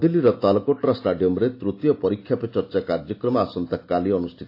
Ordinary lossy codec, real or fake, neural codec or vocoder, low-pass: AAC, 32 kbps; real; none; 5.4 kHz